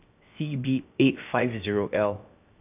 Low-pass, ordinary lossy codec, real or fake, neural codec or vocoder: 3.6 kHz; AAC, 32 kbps; fake; codec, 16 kHz, 0.7 kbps, FocalCodec